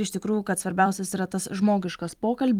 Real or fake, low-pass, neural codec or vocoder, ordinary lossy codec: fake; 19.8 kHz; vocoder, 44.1 kHz, 128 mel bands every 256 samples, BigVGAN v2; Opus, 32 kbps